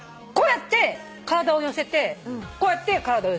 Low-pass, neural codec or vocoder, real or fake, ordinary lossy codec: none; none; real; none